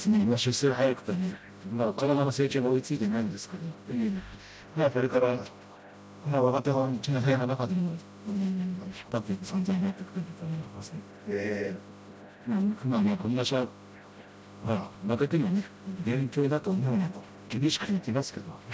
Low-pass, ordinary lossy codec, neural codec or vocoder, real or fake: none; none; codec, 16 kHz, 0.5 kbps, FreqCodec, smaller model; fake